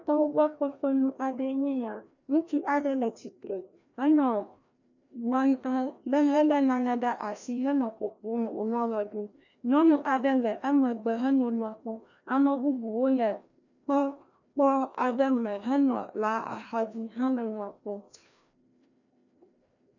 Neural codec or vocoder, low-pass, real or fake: codec, 16 kHz, 1 kbps, FreqCodec, larger model; 7.2 kHz; fake